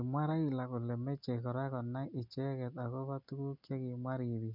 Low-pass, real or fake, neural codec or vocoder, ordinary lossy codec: 5.4 kHz; real; none; none